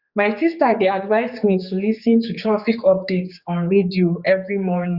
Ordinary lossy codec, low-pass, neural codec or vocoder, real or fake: Opus, 64 kbps; 5.4 kHz; codec, 16 kHz, 4 kbps, X-Codec, HuBERT features, trained on general audio; fake